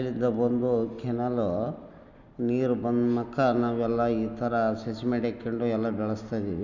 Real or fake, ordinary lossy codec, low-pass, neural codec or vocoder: real; none; 7.2 kHz; none